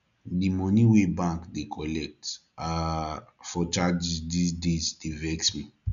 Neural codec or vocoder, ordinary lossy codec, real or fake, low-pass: none; none; real; 7.2 kHz